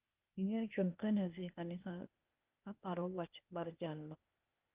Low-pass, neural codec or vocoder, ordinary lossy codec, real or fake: 3.6 kHz; codec, 16 kHz, 0.8 kbps, ZipCodec; Opus, 24 kbps; fake